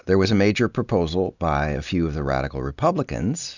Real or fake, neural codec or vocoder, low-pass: real; none; 7.2 kHz